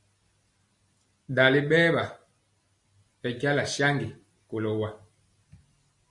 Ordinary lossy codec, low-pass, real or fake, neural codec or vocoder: MP3, 48 kbps; 10.8 kHz; real; none